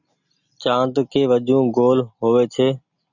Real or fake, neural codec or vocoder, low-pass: real; none; 7.2 kHz